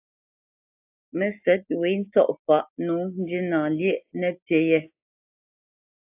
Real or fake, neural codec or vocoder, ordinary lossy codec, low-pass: real; none; AAC, 32 kbps; 3.6 kHz